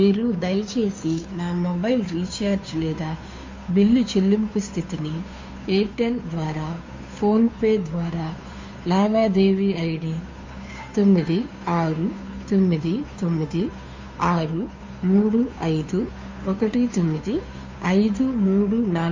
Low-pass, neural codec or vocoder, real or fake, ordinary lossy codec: 7.2 kHz; codec, 16 kHz, 2 kbps, FunCodec, trained on Chinese and English, 25 frames a second; fake; MP3, 48 kbps